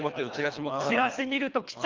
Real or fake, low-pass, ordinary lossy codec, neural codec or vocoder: fake; 7.2 kHz; Opus, 32 kbps; codec, 24 kHz, 3 kbps, HILCodec